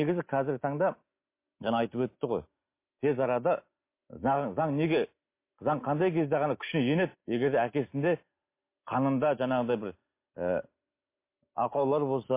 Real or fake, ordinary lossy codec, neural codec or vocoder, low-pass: real; MP3, 24 kbps; none; 3.6 kHz